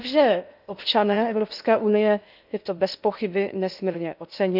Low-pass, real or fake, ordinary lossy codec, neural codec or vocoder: 5.4 kHz; fake; none; codec, 16 kHz in and 24 kHz out, 0.8 kbps, FocalCodec, streaming, 65536 codes